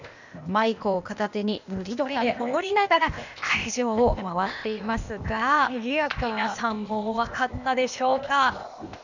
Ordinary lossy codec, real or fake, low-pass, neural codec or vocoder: none; fake; 7.2 kHz; codec, 16 kHz, 0.8 kbps, ZipCodec